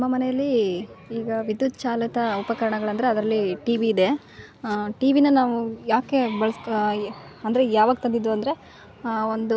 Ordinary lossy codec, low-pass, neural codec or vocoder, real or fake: none; none; none; real